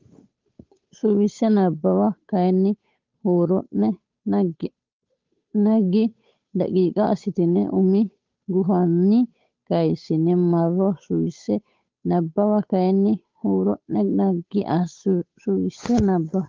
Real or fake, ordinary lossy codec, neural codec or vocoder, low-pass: fake; Opus, 24 kbps; codec, 16 kHz, 8 kbps, FunCodec, trained on Chinese and English, 25 frames a second; 7.2 kHz